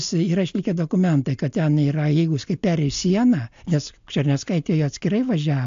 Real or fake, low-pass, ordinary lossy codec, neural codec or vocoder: real; 7.2 kHz; AAC, 64 kbps; none